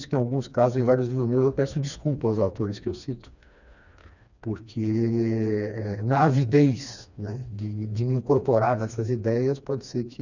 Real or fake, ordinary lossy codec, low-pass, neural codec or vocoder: fake; none; 7.2 kHz; codec, 16 kHz, 2 kbps, FreqCodec, smaller model